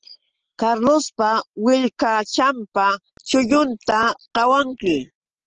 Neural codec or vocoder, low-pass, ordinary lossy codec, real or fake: none; 7.2 kHz; Opus, 16 kbps; real